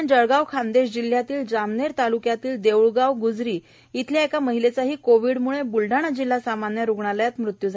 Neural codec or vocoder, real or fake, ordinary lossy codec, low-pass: none; real; none; none